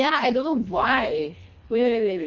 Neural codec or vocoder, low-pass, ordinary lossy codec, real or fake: codec, 24 kHz, 1.5 kbps, HILCodec; 7.2 kHz; none; fake